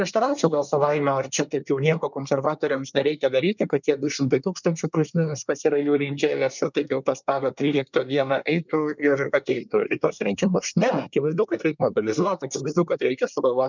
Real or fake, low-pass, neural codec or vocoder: fake; 7.2 kHz; codec, 24 kHz, 1 kbps, SNAC